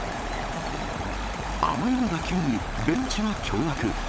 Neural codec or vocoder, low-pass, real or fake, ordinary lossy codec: codec, 16 kHz, 16 kbps, FunCodec, trained on LibriTTS, 50 frames a second; none; fake; none